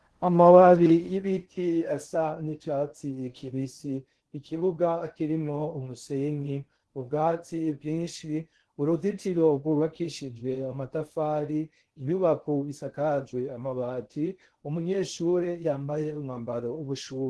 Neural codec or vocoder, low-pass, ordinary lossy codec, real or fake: codec, 16 kHz in and 24 kHz out, 0.6 kbps, FocalCodec, streaming, 4096 codes; 10.8 kHz; Opus, 16 kbps; fake